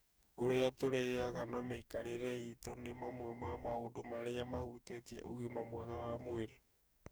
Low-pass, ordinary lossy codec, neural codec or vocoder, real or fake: none; none; codec, 44.1 kHz, 2.6 kbps, DAC; fake